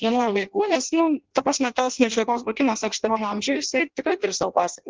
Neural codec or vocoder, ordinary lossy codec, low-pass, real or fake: codec, 16 kHz in and 24 kHz out, 0.6 kbps, FireRedTTS-2 codec; Opus, 16 kbps; 7.2 kHz; fake